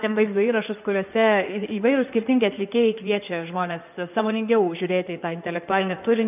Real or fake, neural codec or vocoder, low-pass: fake; codec, 16 kHz in and 24 kHz out, 2.2 kbps, FireRedTTS-2 codec; 3.6 kHz